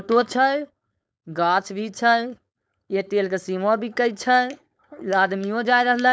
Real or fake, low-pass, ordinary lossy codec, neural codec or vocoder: fake; none; none; codec, 16 kHz, 4.8 kbps, FACodec